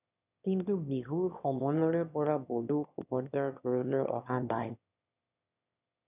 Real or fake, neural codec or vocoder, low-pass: fake; autoencoder, 22.05 kHz, a latent of 192 numbers a frame, VITS, trained on one speaker; 3.6 kHz